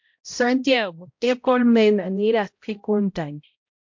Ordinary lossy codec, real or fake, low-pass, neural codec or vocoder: MP3, 48 kbps; fake; 7.2 kHz; codec, 16 kHz, 0.5 kbps, X-Codec, HuBERT features, trained on balanced general audio